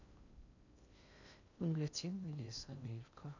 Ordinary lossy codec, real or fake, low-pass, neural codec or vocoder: none; fake; 7.2 kHz; codec, 16 kHz in and 24 kHz out, 0.6 kbps, FocalCodec, streaming, 2048 codes